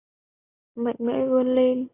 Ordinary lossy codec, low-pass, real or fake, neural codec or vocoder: AAC, 16 kbps; 3.6 kHz; real; none